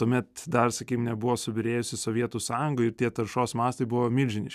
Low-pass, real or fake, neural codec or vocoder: 14.4 kHz; real; none